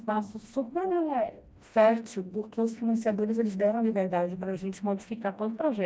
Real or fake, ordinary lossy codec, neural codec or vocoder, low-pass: fake; none; codec, 16 kHz, 1 kbps, FreqCodec, smaller model; none